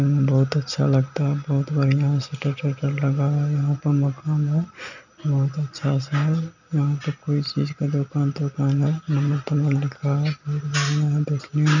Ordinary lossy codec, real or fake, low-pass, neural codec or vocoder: none; real; 7.2 kHz; none